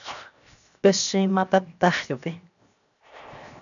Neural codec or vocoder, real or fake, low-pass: codec, 16 kHz, 0.7 kbps, FocalCodec; fake; 7.2 kHz